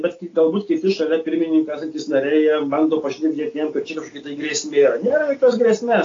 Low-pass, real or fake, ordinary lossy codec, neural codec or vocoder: 7.2 kHz; real; AAC, 32 kbps; none